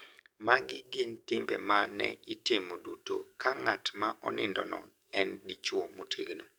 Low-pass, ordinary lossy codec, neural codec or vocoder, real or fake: none; none; codec, 44.1 kHz, 7.8 kbps, DAC; fake